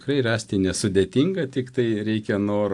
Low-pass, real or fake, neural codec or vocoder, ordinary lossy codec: 10.8 kHz; real; none; AAC, 64 kbps